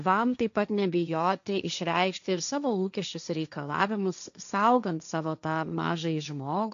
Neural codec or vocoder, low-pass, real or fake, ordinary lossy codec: codec, 16 kHz, 1.1 kbps, Voila-Tokenizer; 7.2 kHz; fake; AAC, 96 kbps